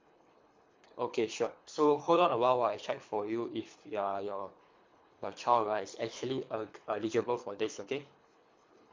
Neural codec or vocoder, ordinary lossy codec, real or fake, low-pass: codec, 24 kHz, 3 kbps, HILCodec; MP3, 48 kbps; fake; 7.2 kHz